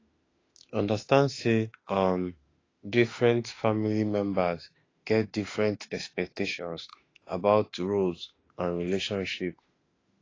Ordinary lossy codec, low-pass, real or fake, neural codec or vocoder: AAC, 32 kbps; 7.2 kHz; fake; autoencoder, 48 kHz, 32 numbers a frame, DAC-VAE, trained on Japanese speech